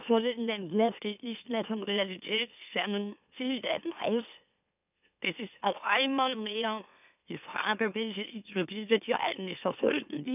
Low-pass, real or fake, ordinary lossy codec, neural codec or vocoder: 3.6 kHz; fake; none; autoencoder, 44.1 kHz, a latent of 192 numbers a frame, MeloTTS